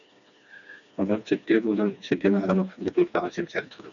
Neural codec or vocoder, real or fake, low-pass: codec, 16 kHz, 2 kbps, FreqCodec, smaller model; fake; 7.2 kHz